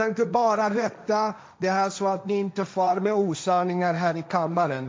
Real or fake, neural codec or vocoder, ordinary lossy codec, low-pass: fake; codec, 16 kHz, 1.1 kbps, Voila-Tokenizer; none; none